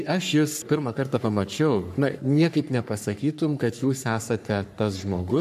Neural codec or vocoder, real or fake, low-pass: codec, 44.1 kHz, 3.4 kbps, Pupu-Codec; fake; 14.4 kHz